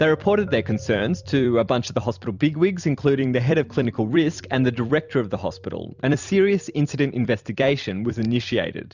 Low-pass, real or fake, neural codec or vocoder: 7.2 kHz; fake; vocoder, 44.1 kHz, 128 mel bands every 256 samples, BigVGAN v2